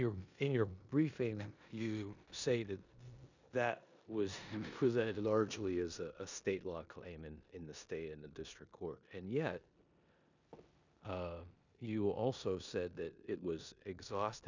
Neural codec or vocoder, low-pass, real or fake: codec, 16 kHz in and 24 kHz out, 0.9 kbps, LongCat-Audio-Codec, four codebook decoder; 7.2 kHz; fake